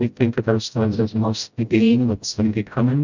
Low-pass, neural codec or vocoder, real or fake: 7.2 kHz; codec, 16 kHz, 0.5 kbps, FreqCodec, smaller model; fake